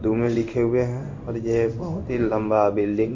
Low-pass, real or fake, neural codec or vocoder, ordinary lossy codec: 7.2 kHz; fake; codec, 16 kHz in and 24 kHz out, 1 kbps, XY-Tokenizer; MP3, 48 kbps